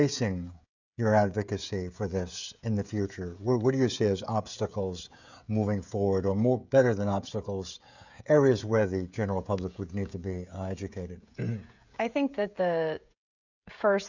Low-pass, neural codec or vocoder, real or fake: 7.2 kHz; codec, 16 kHz, 16 kbps, FreqCodec, smaller model; fake